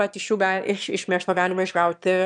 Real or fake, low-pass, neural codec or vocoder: fake; 9.9 kHz; autoencoder, 22.05 kHz, a latent of 192 numbers a frame, VITS, trained on one speaker